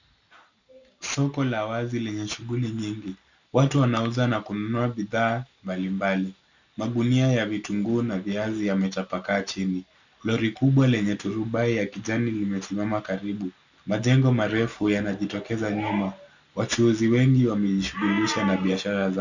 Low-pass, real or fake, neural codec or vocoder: 7.2 kHz; real; none